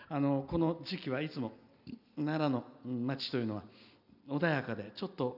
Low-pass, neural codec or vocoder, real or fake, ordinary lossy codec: 5.4 kHz; none; real; none